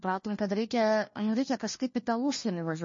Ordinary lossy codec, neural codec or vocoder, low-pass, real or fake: MP3, 32 kbps; codec, 16 kHz, 1 kbps, FunCodec, trained on LibriTTS, 50 frames a second; 7.2 kHz; fake